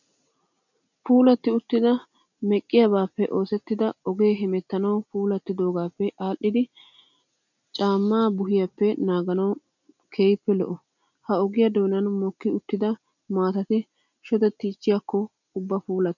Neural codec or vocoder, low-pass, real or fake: none; 7.2 kHz; real